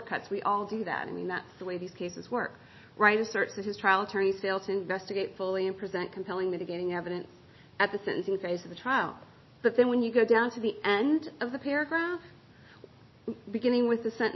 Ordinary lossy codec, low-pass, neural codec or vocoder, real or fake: MP3, 24 kbps; 7.2 kHz; none; real